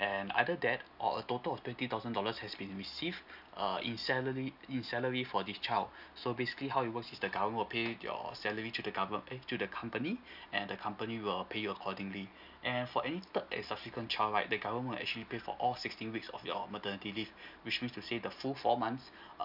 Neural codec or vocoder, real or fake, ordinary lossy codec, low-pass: none; real; none; 5.4 kHz